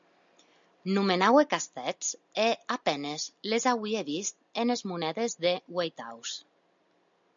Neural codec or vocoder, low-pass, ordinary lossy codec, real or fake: none; 7.2 kHz; MP3, 64 kbps; real